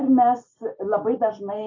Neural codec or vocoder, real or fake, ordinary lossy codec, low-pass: none; real; MP3, 32 kbps; 7.2 kHz